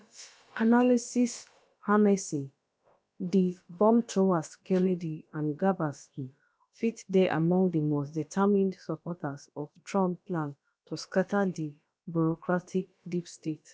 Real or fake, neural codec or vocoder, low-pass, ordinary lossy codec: fake; codec, 16 kHz, about 1 kbps, DyCAST, with the encoder's durations; none; none